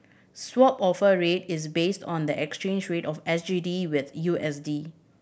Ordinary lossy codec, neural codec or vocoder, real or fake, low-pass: none; none; real; none